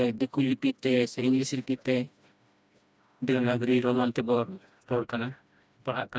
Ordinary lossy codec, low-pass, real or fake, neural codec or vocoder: none; none; fake; codec, 16 kHz, 1 kbps, FreqCodec, smaller model